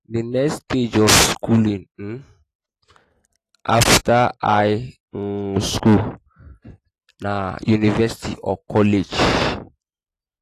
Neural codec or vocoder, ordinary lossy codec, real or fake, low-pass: none; AAC, 48 kbps; real; 14.4 kHz